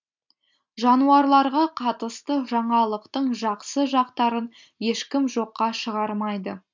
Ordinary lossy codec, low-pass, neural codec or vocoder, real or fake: MP3, 64 kbps; 7.2 kHz; none; real